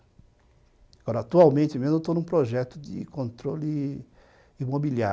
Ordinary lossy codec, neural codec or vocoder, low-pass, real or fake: none; none; none; real